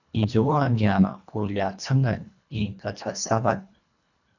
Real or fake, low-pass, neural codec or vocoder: fake; 7.2 kHz; codec, 24 kHz, 1.5 kbps, HILCodec